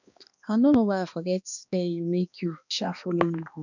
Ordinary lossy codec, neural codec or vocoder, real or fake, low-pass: none; codec, 16 kHz, 2 kbps, X-Codec, HuBERT features, trained on balanced general audio; fake; 7.2 kHz